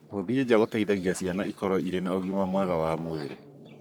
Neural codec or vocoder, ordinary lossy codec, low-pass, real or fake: codec, 44.1 kHz, 3.4 kbps, Pupu-Codec; none; none; fake